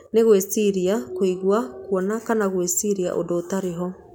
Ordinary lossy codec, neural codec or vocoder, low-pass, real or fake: none; none; 19.8 kHz; real